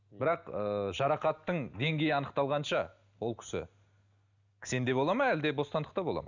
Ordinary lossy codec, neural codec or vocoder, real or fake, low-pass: none; none; real; 7.2 kHz